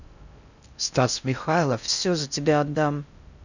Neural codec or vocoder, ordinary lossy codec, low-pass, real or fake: codec, 16 kHz in and 24 kHz out, 0.6 kbps, FocalCodec, streaming, 4096 codes; none; 7.2 kHz; fake